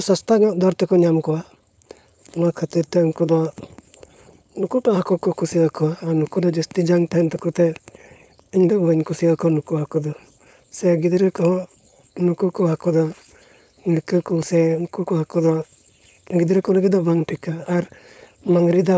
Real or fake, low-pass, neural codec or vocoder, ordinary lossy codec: fake; none; codec, 16 kHz, 4.8 kbps, FACodec; none